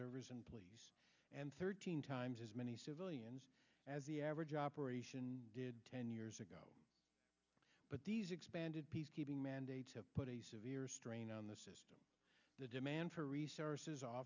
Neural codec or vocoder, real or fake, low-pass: none; real; 7.2 kHz